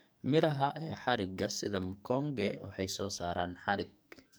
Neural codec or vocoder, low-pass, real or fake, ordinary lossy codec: codec, 44.1 kHz, 2.6 kbps, SNAC; none; fake; none